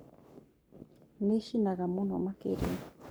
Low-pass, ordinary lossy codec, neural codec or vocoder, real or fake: none; none; codec, 44.1 kHz, 7.8 kbps, Pupu-Codec; fake